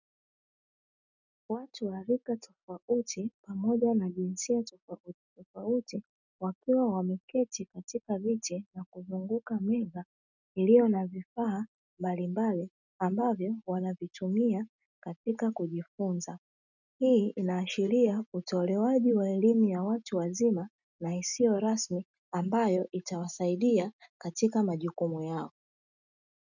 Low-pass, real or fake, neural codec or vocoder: 7.2 kHz; real; none